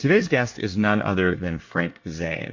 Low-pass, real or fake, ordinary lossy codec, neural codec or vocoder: 7.2 kHz; fake; MP3, 48 kbps; codec, 24 kHz, 1 kbps, SNAC